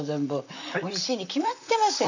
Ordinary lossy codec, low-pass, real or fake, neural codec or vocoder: none; 7.2 kHz; fake; vocoder, 44.1 kHz, 128 mel bands, Pupu-Vocoder